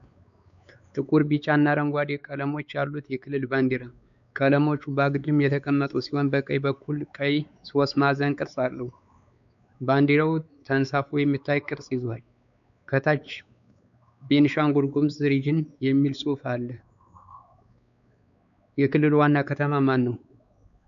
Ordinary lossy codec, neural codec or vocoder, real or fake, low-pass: MP3, 96 kbps; codec, 16 kHz, 4 kbps, X-Codec, WavLM features, trained on Multilingual LibriSpeech; fake; 7.2 kHz